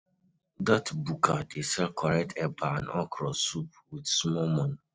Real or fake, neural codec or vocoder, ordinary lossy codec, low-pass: real; none; none; none